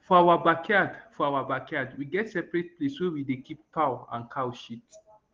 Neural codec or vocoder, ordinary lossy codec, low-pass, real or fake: none; Opus, 16 kbps; 7.2 kHz; real